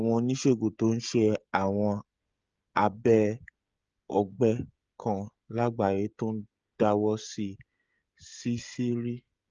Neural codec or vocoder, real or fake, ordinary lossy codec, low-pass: codec, 16 kHz, 16 kbps, FreqCodec, smaller model; fake; Opus, 24 kbps; 7.2 kHz